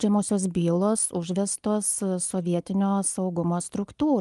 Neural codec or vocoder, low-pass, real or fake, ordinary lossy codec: none; 10.8 kHz; real; Opus, 32 kbps